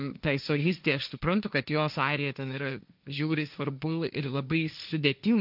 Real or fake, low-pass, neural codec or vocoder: fake; 5.4 kHz; codec, 16 kHz, 1.1 kbps, Voila-Tokenizer